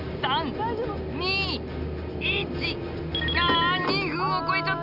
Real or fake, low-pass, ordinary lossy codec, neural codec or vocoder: real; 5.4 kHz; none; none